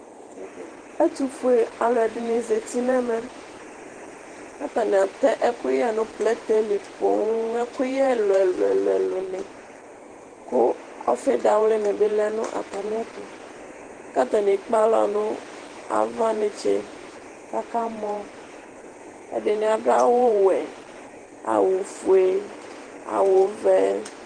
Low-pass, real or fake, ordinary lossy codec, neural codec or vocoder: 9.9 kHz; fake; Opus, 16 kbps; vocoder, 48 kHz, 128 mel bands, Vocos